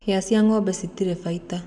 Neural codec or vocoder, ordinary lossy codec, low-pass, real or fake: none; none; 10.8 kHz; real